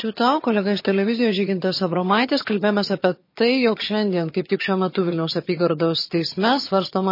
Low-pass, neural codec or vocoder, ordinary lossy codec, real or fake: 5.4 kHz; vocoder, 22.05 kHz, 80 mel bands, HiFi-GAN; MP3, 24 kbps; fake